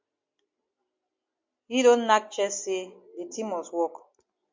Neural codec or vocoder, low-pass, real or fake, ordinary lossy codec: none; 7.2 kHz; real; MP3, 48 kbps